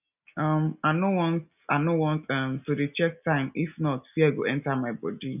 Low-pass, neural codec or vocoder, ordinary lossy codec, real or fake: 3.6 kHz; none; none; real